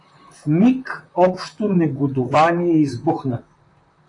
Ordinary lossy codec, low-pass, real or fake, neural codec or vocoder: AAC, 48 kbps; 10.8 kHz; fake; vocoder, 44.1 kHz, 128 mel bands, Pupu-Vocoder